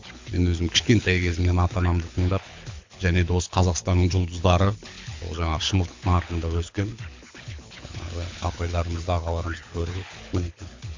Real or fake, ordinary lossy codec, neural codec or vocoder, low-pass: fake; MP3, 48 kbps; codec, 24 kHz, 6 kbps, HILCodec; 7.2 kHz